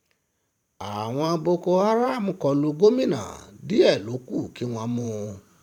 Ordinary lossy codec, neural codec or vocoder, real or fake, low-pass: none; vocoder, 44.1 kHz, 128 mel bands every 512 samples, BigVGAN v2; fake; 19.8 kHz